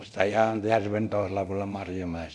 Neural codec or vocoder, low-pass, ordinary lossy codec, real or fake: codec, 24 kHz, 0.9 kbps, DualCodec; none; none; fake